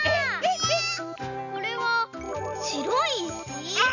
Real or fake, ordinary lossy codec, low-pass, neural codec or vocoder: real; none; 7.2 kHz; none